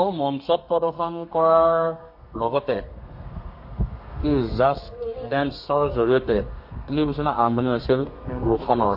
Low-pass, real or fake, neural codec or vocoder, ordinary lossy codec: 5.4 kHz; fake; codec, 32 kHz, 1.9 kbps, SNAC; MP3, 32 kbps